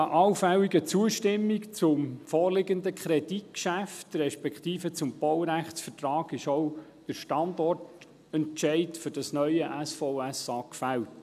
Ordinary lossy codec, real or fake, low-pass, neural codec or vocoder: none; real; 14.4 kHz; none